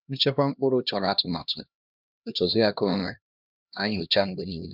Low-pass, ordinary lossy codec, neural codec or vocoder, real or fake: 5.4 kHz; none; codec, 16 kHz, 1 kbps, X-Codec, HuBERT features, trained on LibriSpeech; fake